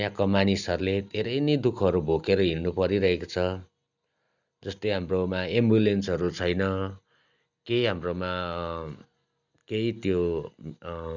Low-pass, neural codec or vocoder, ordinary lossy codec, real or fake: 7.2 kHz; none; none; real